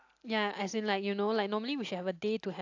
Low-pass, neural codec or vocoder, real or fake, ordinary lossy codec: 7.2 kHz; none; real; AAC, 48 kbps